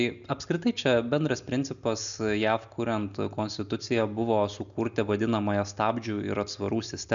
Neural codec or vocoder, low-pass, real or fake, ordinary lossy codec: none; 7.2 kHz; real; MP3, 96 kbps